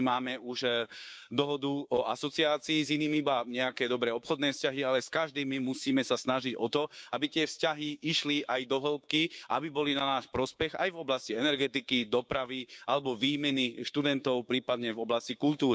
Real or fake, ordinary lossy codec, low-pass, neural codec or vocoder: fake; none; none; codec, 16 kHz, 6 kbps, DAC